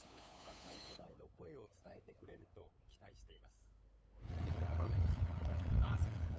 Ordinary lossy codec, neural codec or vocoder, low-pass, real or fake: none; codec, 16 kHz, 8 kbps, FunCodec, trained on LibriTTS, 25 frames a second; none; fake